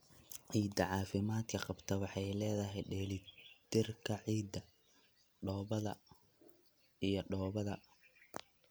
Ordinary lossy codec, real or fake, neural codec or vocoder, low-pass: none; real; none; none